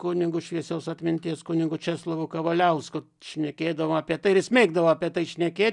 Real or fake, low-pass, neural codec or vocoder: real; 10.8 kHz; none